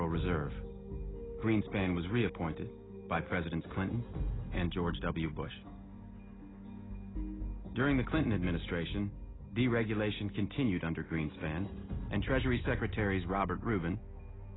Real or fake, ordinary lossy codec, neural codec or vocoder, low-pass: real; AAC, 16 kbps; none; 7.2 kHz